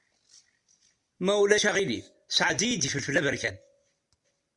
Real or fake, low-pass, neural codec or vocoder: real; 10.8 kHz; none